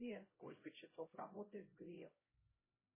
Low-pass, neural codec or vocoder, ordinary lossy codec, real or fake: 3.6 kHz; codec, 16 kHz, 0.5 kbps, X-Codec, HuBERT features, trained on LibriSpeech; MP3, 16 kbps; fake